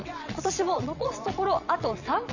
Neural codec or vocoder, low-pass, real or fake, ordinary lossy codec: vocoder, 22.05 kHz, 80 mel bands, WaveNeXt; 7.2 kHz; fake; none